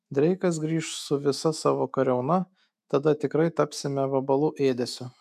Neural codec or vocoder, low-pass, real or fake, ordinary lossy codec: autoencoder, 48 kHz, 128 numbers a frame, DAC-VAE, trained on Japanese speech; 14.4 kHz; fake; MP3, 96 kbps